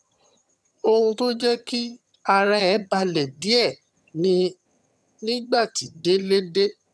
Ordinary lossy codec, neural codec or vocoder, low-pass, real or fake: none; vocoder, 22.05 kHz, 80 mel bands, HiFi-GAN; none; fake